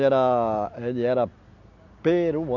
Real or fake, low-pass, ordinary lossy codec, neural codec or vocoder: real; 7.2 kHz; none; none